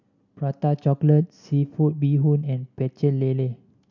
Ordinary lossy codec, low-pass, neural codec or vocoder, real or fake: none; 7.2 kHz; none; real